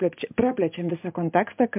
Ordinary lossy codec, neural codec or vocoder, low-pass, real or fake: MP3, 32 kbps; none; 3.6 kHz; real